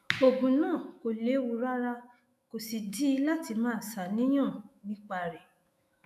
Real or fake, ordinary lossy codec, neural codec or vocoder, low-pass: fake; none; autoencoder, 48 kHz, 128 numbers a frame, DAC-VAE, trained on Japanese speech; 14.4 kHz